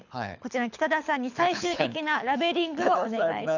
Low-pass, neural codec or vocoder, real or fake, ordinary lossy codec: 7.2 kHz; codec, 24 kHz, 6 kbps, HILCodec; fake; none